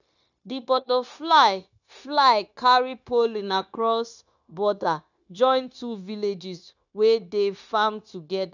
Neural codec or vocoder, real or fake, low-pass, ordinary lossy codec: codec, 16 kHz, 0.9 kbps, LongCat-Audio-Codec; fake; 7.2 kHz; none